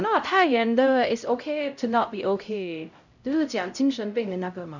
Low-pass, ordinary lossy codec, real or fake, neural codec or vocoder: 7.2 kHz; none; fake; codec, 16 kHz, 0.5 kbps, X-Codec, HuBERT features, trained on LibriSpeech